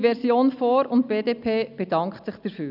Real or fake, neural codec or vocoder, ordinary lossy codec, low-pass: real; none; none; 5.4 kHz